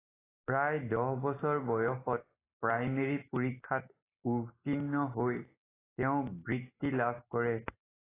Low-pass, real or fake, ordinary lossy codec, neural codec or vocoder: 3.6 kHz; real; AAC, 16 kbps; none